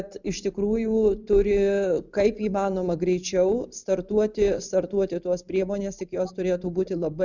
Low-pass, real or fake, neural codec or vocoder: 7.2 kHz; real; none